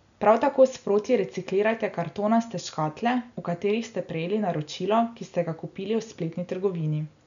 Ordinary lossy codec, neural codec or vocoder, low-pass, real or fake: none; none; 7.2 kHz; real